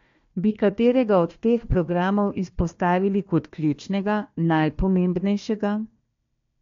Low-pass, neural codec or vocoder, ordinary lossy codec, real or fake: 7.2 kHz; codec, 16 kHz, 1 kbps, FunCodec, trained on Chinese and English, 50 frames a second; MP3, 48 kbps; fake